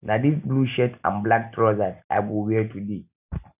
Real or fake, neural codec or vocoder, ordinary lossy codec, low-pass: real; none; none; 3.6 kHz